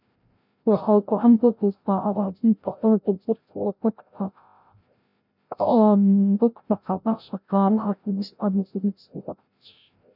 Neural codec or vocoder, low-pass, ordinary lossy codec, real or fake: codec, 16 kHz, 0.5 kbps, FreqCodec, larger model; 5.4 kHz; none; fake